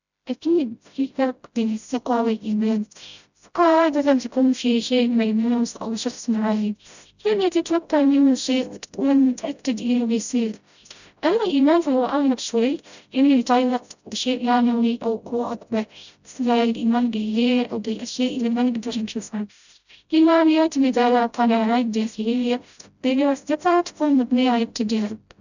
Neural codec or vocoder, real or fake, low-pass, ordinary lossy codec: codec, 16 kHz, 0.5 kbps, FreqCodec, smaller model; fake; 7.2 kHz; none